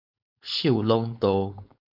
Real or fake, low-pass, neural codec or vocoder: fake; 5.4 kHz; codec, 16 kHz, 4.8 kbps, FACodec